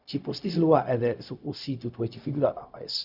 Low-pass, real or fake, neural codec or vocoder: 5.4 kHz; fake; codec, 16 kHz, 0.4 kbps, LongCat-Audio-Codec